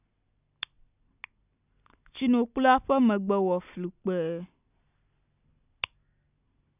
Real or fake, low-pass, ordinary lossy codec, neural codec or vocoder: real; 3.6 kHz; none; none